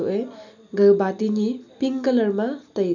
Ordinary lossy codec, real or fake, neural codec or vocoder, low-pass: none; real; none; 7.2 kHz